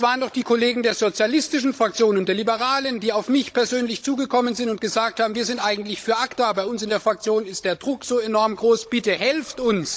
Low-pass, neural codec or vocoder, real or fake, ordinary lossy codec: none; codec, 16 kHz, 16 kbps, FunCodec, trained on Chinese and English, 50 frames a second; fake; none